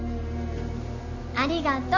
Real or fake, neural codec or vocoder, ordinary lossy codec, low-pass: real; none; none; 7.2 kHz